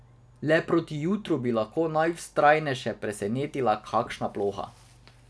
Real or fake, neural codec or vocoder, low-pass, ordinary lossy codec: real; none; none; none